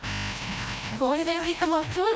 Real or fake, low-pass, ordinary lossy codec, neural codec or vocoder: fake; none; none; codec, 16 kHz, 0.5 kbps, FreqCodec, larger model